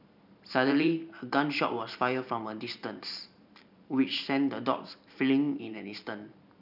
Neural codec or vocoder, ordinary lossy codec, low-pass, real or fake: vocoder, 44.1 kHz, 128 mel bands every 512 samples, BigVGAN v2; none; 5.4 kHz; fake